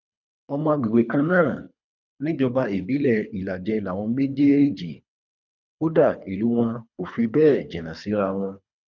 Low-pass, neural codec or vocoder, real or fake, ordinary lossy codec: 7.2 kHz; codec, 24 kHz, 3 kbps, HILCodec; fake; none